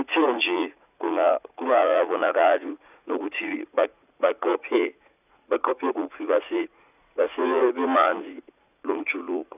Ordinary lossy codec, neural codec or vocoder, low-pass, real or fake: none; vocoder, 44.1 kHz, 80 mel bands, Vocos; 3.6 kHz; fake